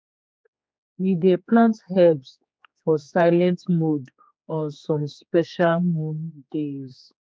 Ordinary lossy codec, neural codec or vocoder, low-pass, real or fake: none; codec, 16 kHz, 4 kbps, X-Codec, HuBERT features, trained on general audio; none; fake